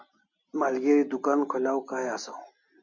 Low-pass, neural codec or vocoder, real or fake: 7.2 kHz; none; real